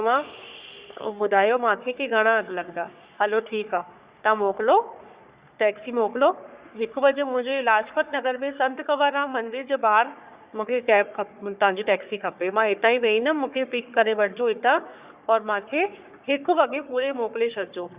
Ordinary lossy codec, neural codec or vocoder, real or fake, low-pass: Opus, 64 kbps; codec, 44.1 kHz, 3.4 kbps, Pupu-Codec; fake; 3.6 kHz